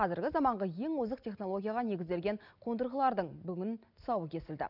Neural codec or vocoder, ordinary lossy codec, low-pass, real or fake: none; none; 5.4 kHz; real